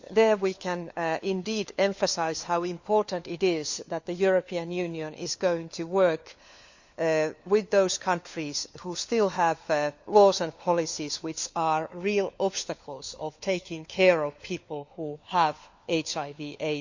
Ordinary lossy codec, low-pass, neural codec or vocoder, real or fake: Opus, 64 kbps; 7.2 kHz; codec, 16 kHz, 4 kbps, FunCodec, trained on LibriTTS, 50 frames a second; fake